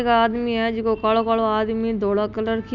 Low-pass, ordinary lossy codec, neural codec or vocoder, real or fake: 7.2 kHz; none; none; real